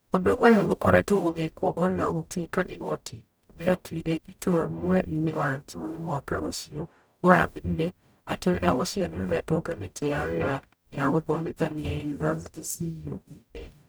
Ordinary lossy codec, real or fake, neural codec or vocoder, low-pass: none; fake; codec, 44.1 kHz, 0.9 kbps, DAC; none